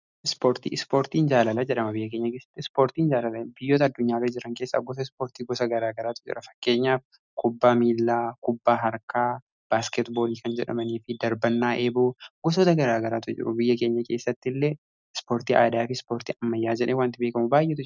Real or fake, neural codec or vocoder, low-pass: real; none; 7.2 kHz